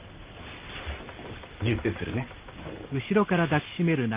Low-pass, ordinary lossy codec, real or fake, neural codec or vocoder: 3.6 kHz; Opus, 32 kbps; real; none